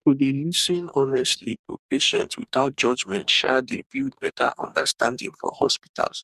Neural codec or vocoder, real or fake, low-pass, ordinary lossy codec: codec, 44.1 kHz, 2.6 kbps, DAC; fake; 14.4 kHz; none